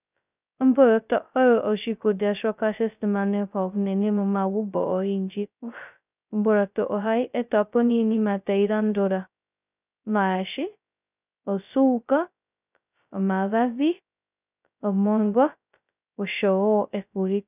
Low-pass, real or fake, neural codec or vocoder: 3.6 kHz; fake; codec, 16 kHz, 0.2 kbps, FocalCodec